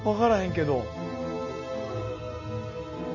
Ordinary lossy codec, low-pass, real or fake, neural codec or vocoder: none; 7.2 kHz; real; none